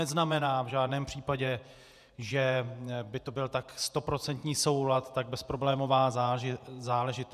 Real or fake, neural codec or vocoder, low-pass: fake; vocoder, 48 kHz, 128 mel bands, Vocos; 14.4 kHz